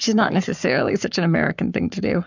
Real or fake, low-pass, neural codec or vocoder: fake; 7.2 kHz; codec, 44.1 kHz, 7.8 kbps, Pupu-Codec